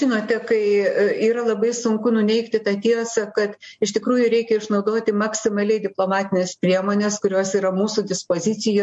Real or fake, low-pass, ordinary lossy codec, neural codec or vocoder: real; 10.8 kHz; MP3, 48 kbps; none